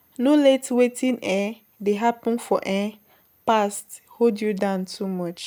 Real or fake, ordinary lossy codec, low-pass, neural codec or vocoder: real; none; none; none